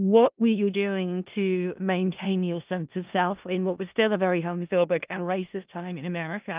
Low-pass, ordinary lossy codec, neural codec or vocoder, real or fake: 3.6 kHz; Opus, 24 kbps; codec, 16 kHz in and 24 kHz out, 0.4 kbps, LongCat-Audio-Codec, four codebook decoder; fake